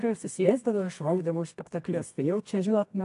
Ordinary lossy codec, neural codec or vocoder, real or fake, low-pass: AAC, 64 kbps; codec, 24 kHz, 0.9 kbps, WavTokenizer, medium music audio release; fake; 10.8 kHz